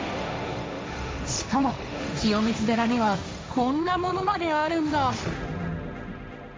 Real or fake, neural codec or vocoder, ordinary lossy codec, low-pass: fake; codec, 16 kHz, 1.1 kbps, Voila-Tokenizer; none; none